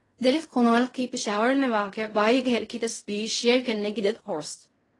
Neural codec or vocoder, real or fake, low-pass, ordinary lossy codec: codec, 16 kHz in and 24 kHz out, 0.4 kbps, LongCat-Audio-Codec, fine tuned four codebook decoder; fake; 10.8 kHz; AAC, 32 kbps